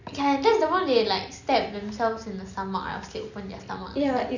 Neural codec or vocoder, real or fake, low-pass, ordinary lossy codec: none; real; 7.2 kHz; none